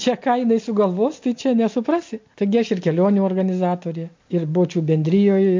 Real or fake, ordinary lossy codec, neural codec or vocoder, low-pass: real; MP3, 48 kbps; none; 7.2 kHz